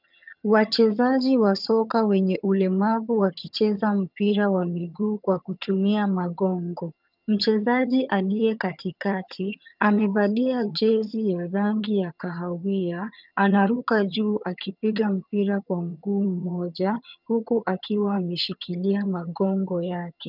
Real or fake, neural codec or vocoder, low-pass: fake; vocoder, 22.05 kHz, 80 mel bands, HiFi-GAN; 5.4 kHz